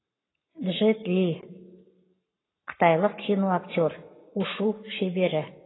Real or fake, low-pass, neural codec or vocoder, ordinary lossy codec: fake; 7.2 kHz; codec, 44.1 kHz, 7.8 kbps, Pupu-Codec; AAC, 16 kbps